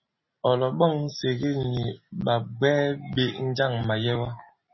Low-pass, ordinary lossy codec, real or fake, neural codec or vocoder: 7.2 kHz; MP3, 24 kbps; real; none